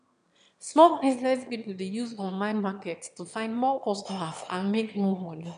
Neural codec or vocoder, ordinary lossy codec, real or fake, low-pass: autoencoder, 22.05 kHz, a latent of 192 numbers a frame, VITS, trained on one speaker; none; fake; 9.9 kHz